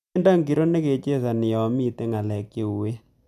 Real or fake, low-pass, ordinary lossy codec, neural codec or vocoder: real; 14.4 kHz; none; none